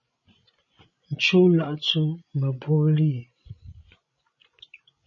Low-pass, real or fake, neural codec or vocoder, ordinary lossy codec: 7.2 kHz; fake; codec, 16 kHz, 16 kbps, FreqCodec, larger model; MP3, 32 kbps